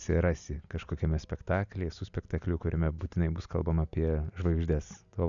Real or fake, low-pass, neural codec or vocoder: real; 7.2 kHz; none